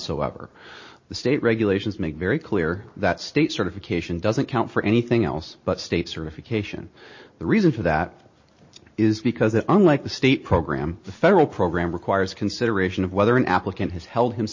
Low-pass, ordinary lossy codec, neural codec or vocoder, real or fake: 7.2 kHz; MP3, 32 kbps; none; real